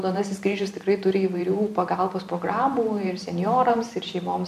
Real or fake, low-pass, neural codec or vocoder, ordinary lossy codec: fake; 14.4 kHz; vocoder, 44.1 kHz, 128 mel bands every 512 samples, BigVGAN v2; MP3, 96 kbps